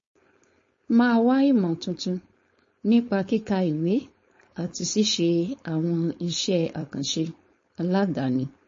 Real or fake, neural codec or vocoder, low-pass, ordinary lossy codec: fake; codec, 16 kHz, 4.8 kbps, FACodec; 7.2 kHz; MP3, 32 kbps